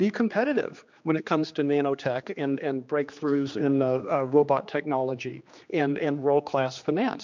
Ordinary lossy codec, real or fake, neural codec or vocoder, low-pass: MP3, 64 kbps; fake; codec, 16 kHz, 2 kbps, X-Codec, HuBERT features, trained on general audio; 7.2 kHz